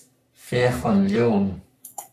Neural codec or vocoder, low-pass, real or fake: codec, 44.1 kHz, 3.4 kbps, Pupu-Codec; 14.4 kHz; fake